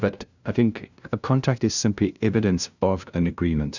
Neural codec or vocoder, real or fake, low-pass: codec, 16 kHz, 0.5 kbps, FunCodec, trained on LibriTTS, 25 frames a second; fake; 7.2 kHz